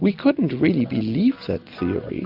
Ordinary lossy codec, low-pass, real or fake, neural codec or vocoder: AAC, 48 kbps; 5.4 kHz; real; none